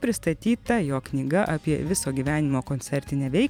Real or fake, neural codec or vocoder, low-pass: fake; vocoder, 44.1 kHz, 128 mel bands every 512 samples, BigVGAN v2; 19.8 kHz